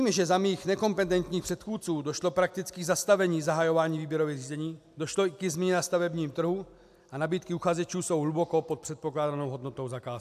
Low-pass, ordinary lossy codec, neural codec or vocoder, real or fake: 14.4 kHz; AAC, 96 kbps; none; real